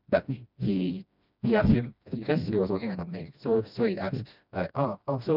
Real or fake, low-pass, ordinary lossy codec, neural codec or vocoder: fake; 5.4 kHz; none; codec, 16 kHz, 1 kbps, FreqCodec, smaller model